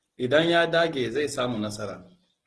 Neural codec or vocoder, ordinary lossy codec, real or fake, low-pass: none; Opus, 16 kbps; real; 10.8 kHz